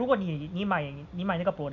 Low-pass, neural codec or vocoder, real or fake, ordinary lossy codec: 7.2 kHz; none; real; none